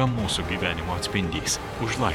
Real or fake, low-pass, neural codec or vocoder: fake; 19.8 kHz; vocoder, 44.1 kHz, 128 mel bands every 512 samples, BigVGAN v2